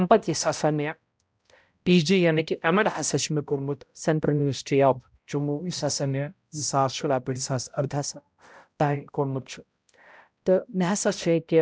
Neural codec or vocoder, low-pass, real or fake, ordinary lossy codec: codec, 16 kHz, 0.5 kbps, X-Codec, HuBERT features, trained on balanced general audio; none; fake; none